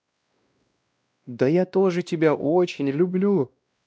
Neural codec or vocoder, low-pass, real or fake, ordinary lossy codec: codec, 16 kHz, 1 kbps, X-Codec, WavLM features, trained on Multilingual LibriSpeech; none; fake; none